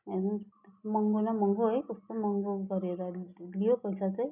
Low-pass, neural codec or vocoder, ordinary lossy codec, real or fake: 3.6 kHz; none; none; real